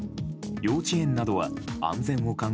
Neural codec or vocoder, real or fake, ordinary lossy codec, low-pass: none; real; none; none